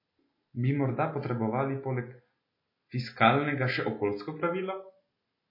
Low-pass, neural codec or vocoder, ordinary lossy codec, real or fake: 5.4 kHz; none; MP3, 24 kbps; real